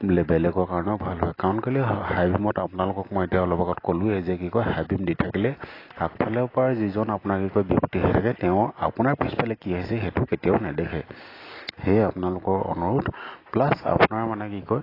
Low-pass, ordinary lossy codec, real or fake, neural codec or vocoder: 5.4 kHz; AAC, 24 kbps; real; none